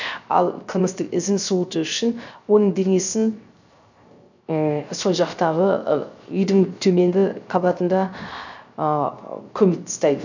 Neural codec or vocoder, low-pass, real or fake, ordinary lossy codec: codec, 16 kHz, 0.3 kbps, FocalCodec; 7.2 kHz; fake; none